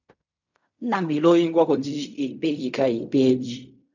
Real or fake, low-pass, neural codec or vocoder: fake; 7.2 kHz; codec, 16 kHz in and 24 kHz out, 0.4 kbps, LongCat-Audio-Codec, fine tuned four codebook decoder